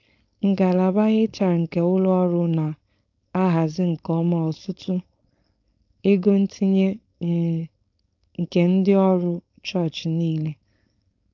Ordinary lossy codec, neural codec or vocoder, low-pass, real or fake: AAC, 48 kbps; codec, 16 kHz, 4.8 kbps, FACodec; 7.2 kHz; fake